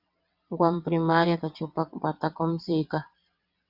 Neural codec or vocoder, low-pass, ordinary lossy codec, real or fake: vocoder, 22.05 kHz, 80 mel bands, WaveNeXt; 5.4 kHz; Opus, 64 kbps; fake